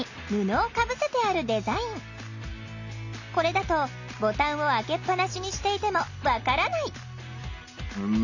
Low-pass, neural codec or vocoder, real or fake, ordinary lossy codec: 7.2 kHz; none; real; none